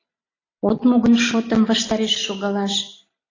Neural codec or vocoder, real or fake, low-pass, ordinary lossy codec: none; real; 7.2 kHz; AAC, 32 kbps